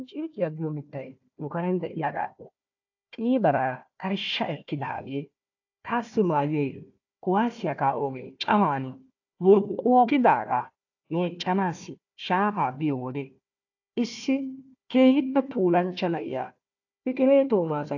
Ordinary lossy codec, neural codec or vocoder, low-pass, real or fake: AAC, 48 kbps; codec, 16 kHz, 1 kbps, FunCodec, trained on Chinese and English, 50 frames a second; 7.2 kHz; fake